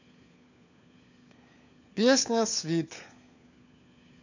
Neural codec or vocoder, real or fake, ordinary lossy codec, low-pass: codec, 16 kHz, 4 kbps, FunCodec, trained on LibriTTS, 50 frames a second; fake; none; 7.2 kHz